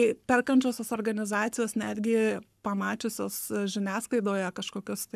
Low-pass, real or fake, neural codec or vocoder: 14.4 kHz; fake; codec, 44.1 kHz, 7.8 kbps, Pupu-Codec